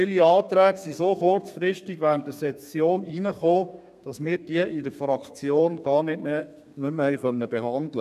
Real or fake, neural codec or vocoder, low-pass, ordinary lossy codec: fake; codec, 32 kHz, 1.9 kbps, SNAC; 14.4 kHz; none